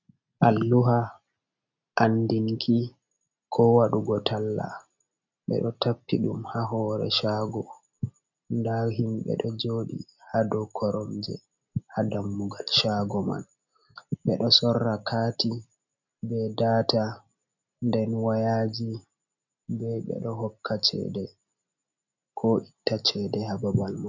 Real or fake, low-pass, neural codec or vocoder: real; 7.2 kHz; none